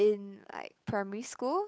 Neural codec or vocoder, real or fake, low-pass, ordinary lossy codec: codec, 16 kHz, 8 kbps, FunCodec, trained on Chinese and English, 25 frames a second; fake; none; none